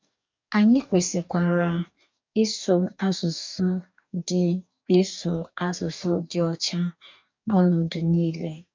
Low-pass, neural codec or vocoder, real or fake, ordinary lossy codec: 7.2 kHz; codec, 44.1 kHz, 2.6 kbps, DAC; fake; MP3, 64 kbps